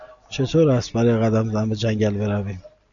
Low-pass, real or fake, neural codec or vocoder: 7.2 kHz; real; none